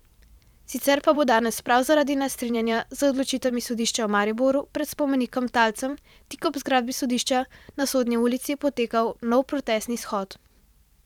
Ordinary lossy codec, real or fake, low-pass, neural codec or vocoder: none; fake; 19.8 kHz; vocoder, 44.1 kHz, 128 mel bands every 512 samples, BigVGAN v2